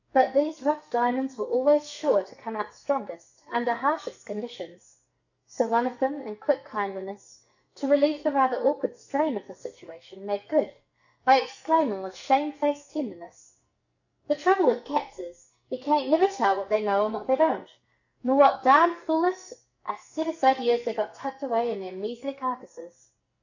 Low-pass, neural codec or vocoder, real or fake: 7.2 kHz; codec, 44.1 kHz, 2.6 kbps, SNAC; fake